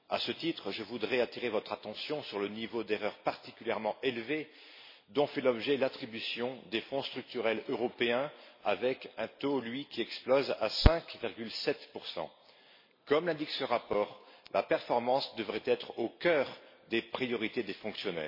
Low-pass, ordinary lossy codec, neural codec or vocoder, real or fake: 5.4 kHz; MP3, 24 kbps; none; real